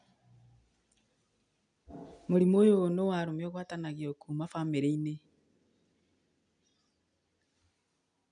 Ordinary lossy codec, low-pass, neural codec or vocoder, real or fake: none; 9.9 kHz; none; real